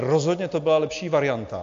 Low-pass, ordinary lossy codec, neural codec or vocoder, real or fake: 7.2 kHz; AAC, 48 kbps; none; real